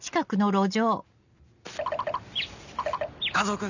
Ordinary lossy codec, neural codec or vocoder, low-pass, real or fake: none; none; 7.2 kHz; real